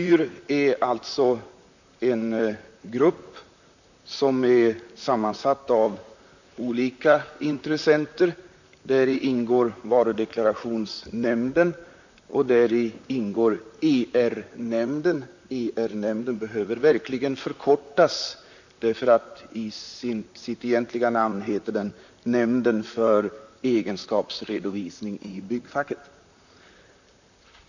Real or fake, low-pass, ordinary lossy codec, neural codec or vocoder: fake; 7.2 kHz; none; vocoder, 44.1 kHz, 128 mel bands, Pupu-Vocoder